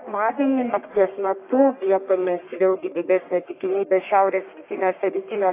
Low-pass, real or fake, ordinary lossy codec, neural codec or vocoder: 3.6 kHz; fake; AAC, 24 kbps; codec, 44.1 kHz, 1.7 kbps, Pupu-Codec